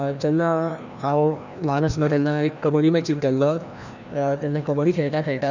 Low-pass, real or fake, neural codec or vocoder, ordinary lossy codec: 7.2 kHz; fake; codec, 16 kHz, 1 kbps, FreqCodec, larger model; none